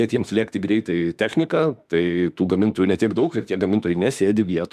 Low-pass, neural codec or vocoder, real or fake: 14.4 kHz; autoencoder, 48 kHz, 32 numbers a frame, DAC-VAE, trained on Japanese speech; fake